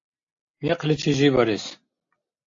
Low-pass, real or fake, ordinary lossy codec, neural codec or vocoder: 7.2 kHz; real; AAC, 48 kbps; none